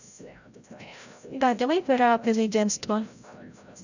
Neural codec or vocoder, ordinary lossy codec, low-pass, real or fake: codec, 16 kHz, 0.5 kbps, FreqCodec, larger model; none; 7.2 kHz; fake